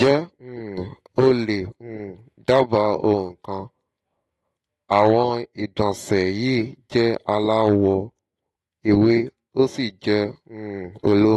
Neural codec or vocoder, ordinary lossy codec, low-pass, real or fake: autoencoder, 48 kHz, 128 numbers a frame, DAC-VAE, trained on Japanese speech; AAC, 32 kbps; 19.8 kHz; fake